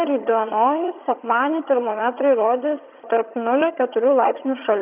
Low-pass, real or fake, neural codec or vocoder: 3.6 kHz; fake; vocoder, 22.05 kHz, 80 mel bands, HiFi-GAN